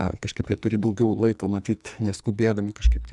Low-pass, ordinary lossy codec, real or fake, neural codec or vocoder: 10.8 kHz; Opus, 64 kbps; fake; codec, 44.1 kHz, 2.6 kbps, SNAC